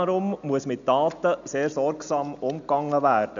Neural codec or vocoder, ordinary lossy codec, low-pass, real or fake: none; none; 7.2 kHz; real